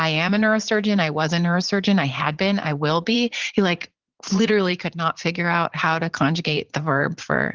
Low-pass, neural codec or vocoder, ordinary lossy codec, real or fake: 7.2 kHz; vocoder, 44.1 kHz, 80 mel bands, Vocos; Opus, 16 kbps; fake